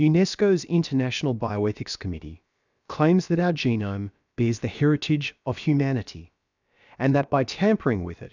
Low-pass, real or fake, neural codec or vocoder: 7.2 kHz; fake; codec, 16 kHz, about 1 kbps, DyCAST, with the encoder's durations